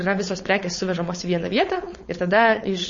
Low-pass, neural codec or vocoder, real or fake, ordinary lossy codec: 7.2 kHz; codec, 16 kHz, 4.8 kbps, FACodec; fake; MP3, 32 kbps